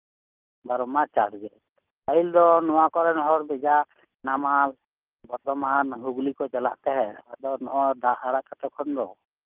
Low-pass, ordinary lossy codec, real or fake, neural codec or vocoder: 3.6 kHz; Opus, 32 kbps; real; none